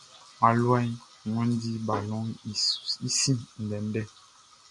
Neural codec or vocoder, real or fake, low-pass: none; real; 10.8 kHz